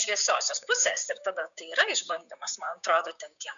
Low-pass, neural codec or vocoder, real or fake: 7.2 kHz; codec, 16 kHz, 6 kbps, DAC; fake